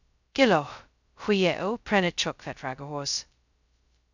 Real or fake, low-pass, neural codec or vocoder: fake; 7.2 kHz; codec, 16 kHz, 0.2 kbps, FocalCodec